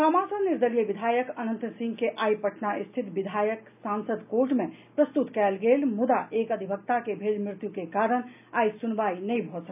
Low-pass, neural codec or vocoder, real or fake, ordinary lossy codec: 3.6 kHz; none; real; none